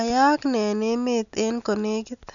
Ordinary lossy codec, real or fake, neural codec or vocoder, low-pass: none; real; none; 7.2 kHz